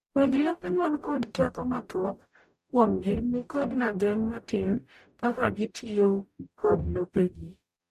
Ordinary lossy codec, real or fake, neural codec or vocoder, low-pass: MP3, 64 kbps; fake; codec, 44.1 kHz, 0.9 kbps, DAC; 14.4 kHz